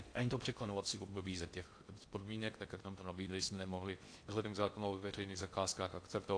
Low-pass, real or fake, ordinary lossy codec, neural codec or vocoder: 9.9 kHz; fake; AAC, 48 kbps; codec, 16 kHz in and 24 kHz out, 0.6 kbps, FocalCodec, streaming, 4096 codes